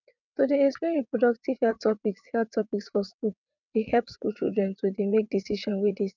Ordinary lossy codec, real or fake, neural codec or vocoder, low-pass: none; fake; vocoder, 22.05 kHz, 80 mel bands, WaveNeXt; 7.2 kHz